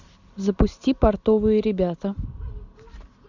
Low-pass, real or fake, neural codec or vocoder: 7.2 kHz; real; none